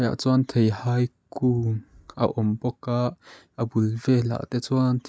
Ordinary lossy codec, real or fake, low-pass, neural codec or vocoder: none; real; none; none